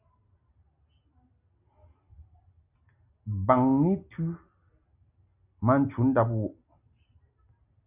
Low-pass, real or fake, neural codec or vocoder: 3.6 kHz; real; none